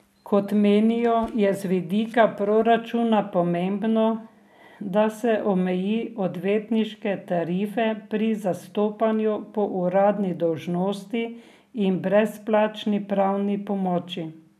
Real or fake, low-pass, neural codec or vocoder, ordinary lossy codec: real; 14.4 kHz; none; none